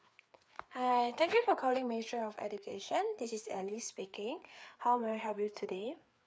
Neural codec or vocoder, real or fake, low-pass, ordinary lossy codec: codec, 16 kHz, 4 kbps, FreqCodec, larger model; fake; none; none